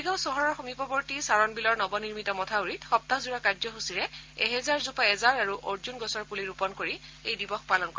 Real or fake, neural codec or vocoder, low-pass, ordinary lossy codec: real; none; 7.2 kHz; Opus, 24 kbps